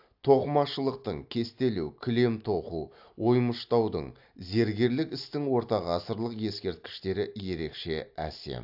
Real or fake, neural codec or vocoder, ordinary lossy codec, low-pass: real; none; AAC, 48 kbps; 5.4 kHz